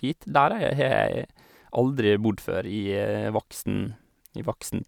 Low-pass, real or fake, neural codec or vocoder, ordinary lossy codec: 19.8 kHz; real; none; none